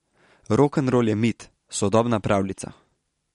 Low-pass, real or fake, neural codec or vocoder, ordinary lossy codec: 19.8 kHz; real; none; MP3, 48 kbps